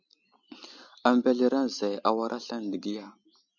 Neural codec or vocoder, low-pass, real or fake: none; 7.2 kHz; real